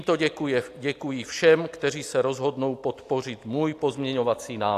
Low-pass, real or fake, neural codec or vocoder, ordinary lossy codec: 14.4 kHz; real; none; AAC, 64 kbps